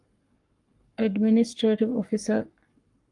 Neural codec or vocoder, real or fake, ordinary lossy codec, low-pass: codec, 44.1 kHz, 7.8 kbps, Pupu-Codec; fake; Opus, 32 kbps; 10.8 kHz